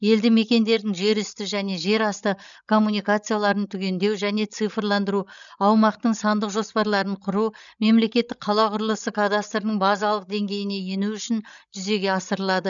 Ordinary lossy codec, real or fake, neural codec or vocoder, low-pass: none; fake; codec, 16 kHz, 16 kbps, FreqCodec, larger model; 7.2 kHz